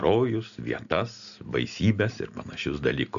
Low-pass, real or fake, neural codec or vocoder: 7.2 kHz; real; none